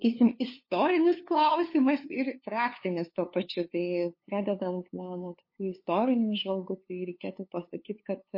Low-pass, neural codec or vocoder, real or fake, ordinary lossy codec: 5.4 kHz; codec, 16 kHz, 2 kbps, FunCodec, trained on LibriTTS, 25 frames a second; fake; MP3, 32 kbps